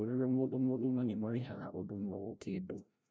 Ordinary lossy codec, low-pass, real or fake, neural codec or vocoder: none; none; fake; codec, 16 kHz, 0.5 kbps, FreqCodec, larger model